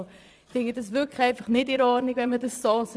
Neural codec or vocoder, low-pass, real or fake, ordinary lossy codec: vocoder, 22.05 kHz, 80 mel bands, Vocos; none; fake; none